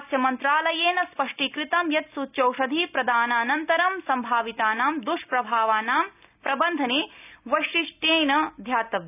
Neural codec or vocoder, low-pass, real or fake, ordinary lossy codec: none; 3.6 kHz; real; none